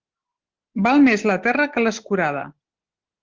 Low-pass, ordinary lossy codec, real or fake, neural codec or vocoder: 7.2 kHz; Opus, 16 kbps; real; none